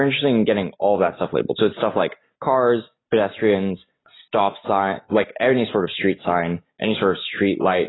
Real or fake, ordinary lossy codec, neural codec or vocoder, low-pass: real; AAC, 16 kbps; none; 7.2 kHz